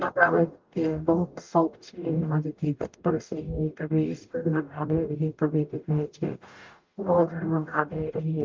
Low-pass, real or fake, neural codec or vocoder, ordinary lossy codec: 7.2 kHz; fake; codec, 44.1 kHz, 0.9 kbps, DAC; Opus, 32 kbps